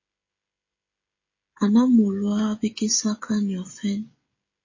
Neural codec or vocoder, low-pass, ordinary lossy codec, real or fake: codec, 16 kHz, 16 kbps, FreqCodec, smaller model; 7.2 kHz; MP3, 32 kbps; fake